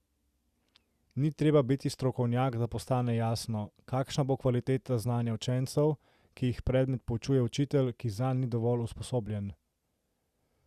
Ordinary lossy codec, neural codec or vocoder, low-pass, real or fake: Opus, 64 kbps; none; 14.4 kHz; real